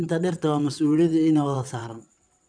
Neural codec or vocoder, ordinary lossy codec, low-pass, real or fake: vocoder, 22.05 kHz, 80 mel bands, WaveNeXt; none; 9.9 kHz; fake